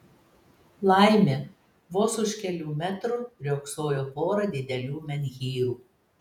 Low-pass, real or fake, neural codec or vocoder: 19.8 kHz; fake; vocoder, 48 kHz, 128 mel bands, Vocos